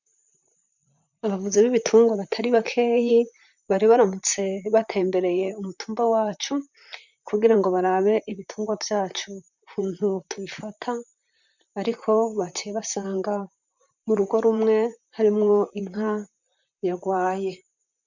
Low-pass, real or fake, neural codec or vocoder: 7.2 kHz; fake; vocoder, 44.1 kHz, 128 mel bands, Pupu-Vocoder